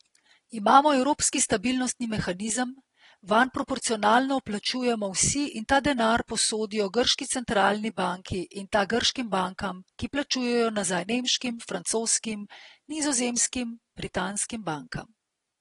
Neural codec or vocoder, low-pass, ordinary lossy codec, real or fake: none; 10.8 kHz; AAC, 32 kbps; real